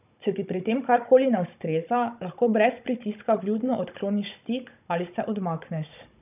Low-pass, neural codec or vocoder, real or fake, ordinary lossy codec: 3.6 kHz; codec, 16 kHz, 16 kbps, FunCodec, trained on Chinese and English, 50 frames a second; fake; none